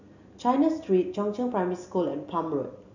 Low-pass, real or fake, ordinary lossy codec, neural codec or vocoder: 7.2 kHz; real; none; none